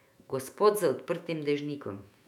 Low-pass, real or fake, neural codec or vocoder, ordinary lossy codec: 19.8 kHz; fake; autoencoder, 48 kHz, 128 numbers a frame, DAC-VAE, trained on Japanese speech; none